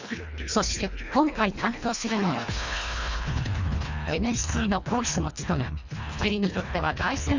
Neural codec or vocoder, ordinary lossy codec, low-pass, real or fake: codec, 24 kHz, 1.5 kbps, HILCodec; Opus, 64 kbps; 7.2 kHz; fake